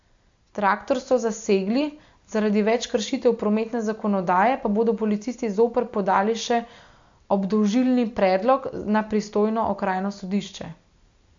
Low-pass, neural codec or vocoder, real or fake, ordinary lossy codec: 7.2 kHz; none; real; AAC, 64 kbps